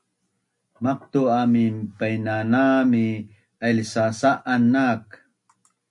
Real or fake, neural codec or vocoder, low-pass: real; none; 10.8 kHz